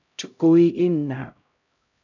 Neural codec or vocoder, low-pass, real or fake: codec, 16 kHz, 0.5 kbps, X-Codec, HuBERT features, trained on LibriSpeech; 7.2 kHz; fake